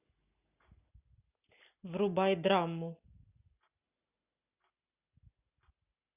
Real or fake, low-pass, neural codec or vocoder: real; 3.6 kHz; none